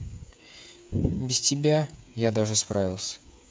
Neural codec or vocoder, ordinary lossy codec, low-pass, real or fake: codec, 16 kHz, 8 kbps, FreqCodec, smaller model; none; none; fake